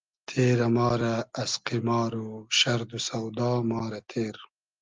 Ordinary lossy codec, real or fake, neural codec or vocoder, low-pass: Opus, 32 kbps; real; none; 7.2 kHz